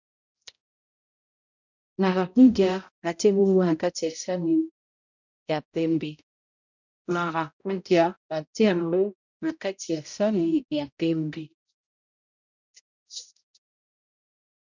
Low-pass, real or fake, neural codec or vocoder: 7.2 kHz; fake; codec, 16 kHz, 0.5 kbps, X-Codec, HuBERT features, trained on balanced general audio